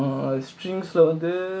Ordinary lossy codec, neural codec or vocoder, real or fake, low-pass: none; none; real; none